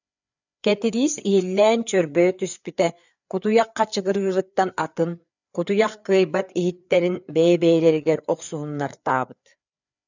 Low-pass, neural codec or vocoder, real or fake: 7.2 kHz; codec, 16 kHz, 4 kbps, FreqCodec, larger model; fake